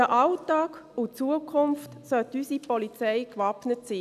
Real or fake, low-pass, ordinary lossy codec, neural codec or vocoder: real; 14.4 kHz; none; none